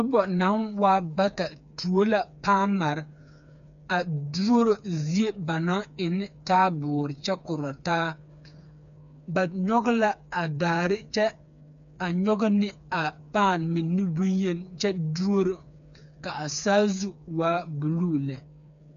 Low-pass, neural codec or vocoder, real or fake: 7.2 kHz; codec, 16 kHz, 4 kbps, FreqCodec, smaller model; fake